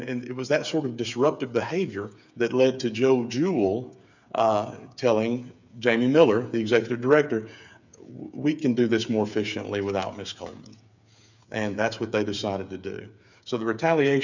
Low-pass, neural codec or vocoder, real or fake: 7.2 kHz; codec, 16 kHz, 8 kbps, FreqCodec, smaller model; fake